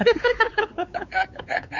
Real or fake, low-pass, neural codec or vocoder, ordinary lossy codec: fake; 7.2 kHz; codec, 16 kHz, 4 kbps, X-Codec, WavLM features, trained on Multilingual LibriSpeech; none